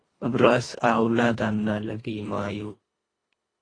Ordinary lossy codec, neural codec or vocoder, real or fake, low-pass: AAC, 32 kbps; codec, 24 kHz, 1.5 kbps, HILCodec; fake; 9.9 kHz